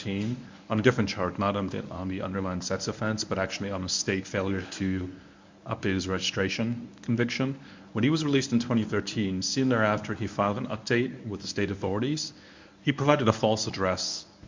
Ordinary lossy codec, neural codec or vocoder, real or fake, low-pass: MP3, 64 kbps; codec, 24 kHz, 0.9 kbps, WavTokenizer, medium speech release version 1; fake; 7.2 kHz